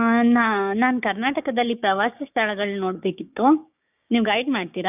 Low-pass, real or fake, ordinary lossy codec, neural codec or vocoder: 3.6 kHz; fake; none; codec, 16 kHz, 6 kbps, DAC